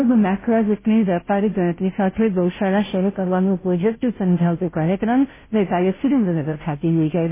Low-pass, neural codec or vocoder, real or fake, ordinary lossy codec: 3.6 kHz; codec, 16 kHz, 0.5 kbps, FunCodec, trained on Chinese and English, 25 frames a second; fake; MP3, 16 kbps